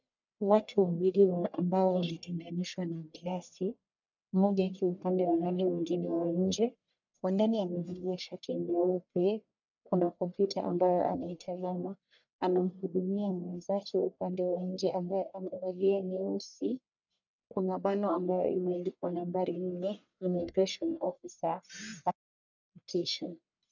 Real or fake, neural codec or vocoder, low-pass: fake; codec, 44.1 kHz, 1.7 kbps, Pupu-Codec; 7.2 kHz